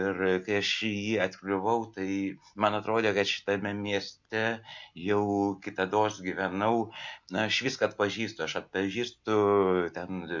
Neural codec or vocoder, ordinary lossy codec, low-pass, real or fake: none; MP3, 64 kbps; 7.2 kHz; real